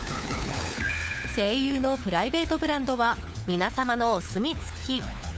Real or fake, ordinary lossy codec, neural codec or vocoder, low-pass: fake; none; codec, 16 kHz, 4 kbps, FunCodec, trained on LibriTTS, 50 frames a second; none